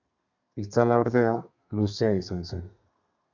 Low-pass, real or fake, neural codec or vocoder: 7.2 kHz; fake; codec, 32 kHz, 1.9 kbps, SNAC